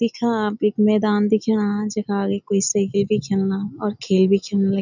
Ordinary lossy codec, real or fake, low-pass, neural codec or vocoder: none; real; 7.2 kHz; none